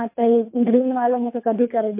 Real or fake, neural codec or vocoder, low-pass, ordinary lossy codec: fake; codec, 24 kHz, 3 kbps, HILCodec; 3.6 kHz; MP3, 24 kbps